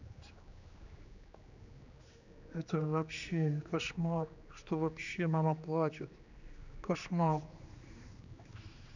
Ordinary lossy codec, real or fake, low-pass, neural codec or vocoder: none; fake; 7.2 kHz; codec, 16 kHz, 2 kbps, X-Codec, HuBERT features, trained on general audio